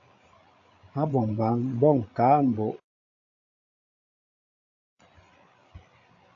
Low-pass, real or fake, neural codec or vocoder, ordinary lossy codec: 7.2 kHz; fake; codec, 16 kHz, 8 kbps, FreqCodec, larger model; AAC, 48 kbps